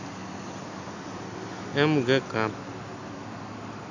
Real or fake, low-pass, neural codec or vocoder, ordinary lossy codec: real; 7.2 kHz; none; none